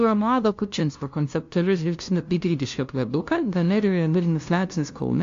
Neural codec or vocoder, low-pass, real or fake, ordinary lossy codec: codec, 16 kHz, 0.5 kbps, FunCodec, trained on LibriTTS, 25 frames a second; 7.2 kHz; fake; AAC, 48 kbps